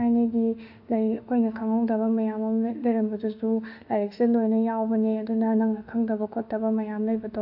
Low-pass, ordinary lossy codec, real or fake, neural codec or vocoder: 5.4 kHz; AAC, 48 kbps; fake; autoencoder, 48 kHz, 32 numbers a frame, DAC-VAE, trained on Japanese speech